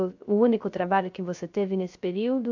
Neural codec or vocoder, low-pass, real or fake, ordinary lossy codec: codec, 16 kHz, 0.3 kbps, FocalCodec; 7.2 kHz; fake; AAC, 48 kbps